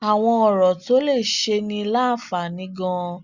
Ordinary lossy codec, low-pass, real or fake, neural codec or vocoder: Opus, 64 kbps; 7.2 kHz; real; none